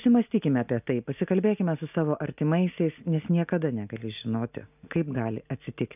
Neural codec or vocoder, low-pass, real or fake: none; 3.6 kHz; real